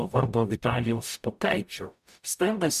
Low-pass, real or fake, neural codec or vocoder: 14.4 kHz; fake; codec, 44.1 kHz, 0.9 kbps, DAC